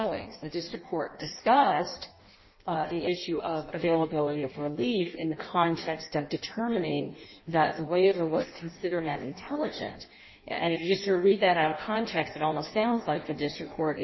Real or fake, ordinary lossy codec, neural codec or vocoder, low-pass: fake; MP3, 24 kbps; codec, 16 kHz in and 24 kHz out, 0.6 kbps, FireRedTTS-2 codec; 7.2 kHz